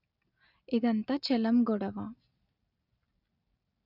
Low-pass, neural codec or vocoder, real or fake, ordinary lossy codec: 5.4 kHz; none; real; none